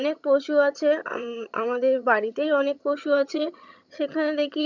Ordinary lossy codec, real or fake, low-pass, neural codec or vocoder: none; fake; 7.2 kHz; vocoder, 22.05 kHz, 80 mel bands, HiFi-GAN